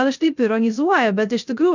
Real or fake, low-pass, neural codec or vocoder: fake; 7.2 kHz; codec, 16 kHz, 0.3 kbps, FocalCodec